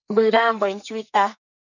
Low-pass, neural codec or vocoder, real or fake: 7.2 kHz; codec, 44.1 kHz, 2.6 kbps, SNAC; fake